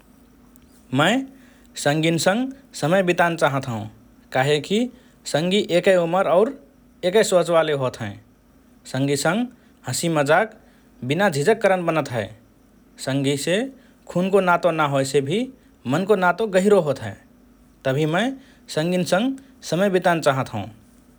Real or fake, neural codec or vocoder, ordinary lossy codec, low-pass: real; none; none; none